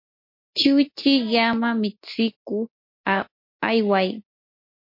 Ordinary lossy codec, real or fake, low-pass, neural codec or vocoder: MP3, 32 kbps; real; 5.4 kHz; none